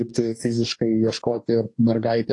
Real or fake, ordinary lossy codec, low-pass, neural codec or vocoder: fake; AAC, 48 kbps; 10.8 kHz; codec, 44.1 kHz, 2.6 kbps, DAC